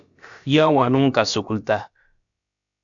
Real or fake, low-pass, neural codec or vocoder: fake; 7.2 kHz; codec, 16 kHz, about 1 kbps, DyCAST, with the encoder's durations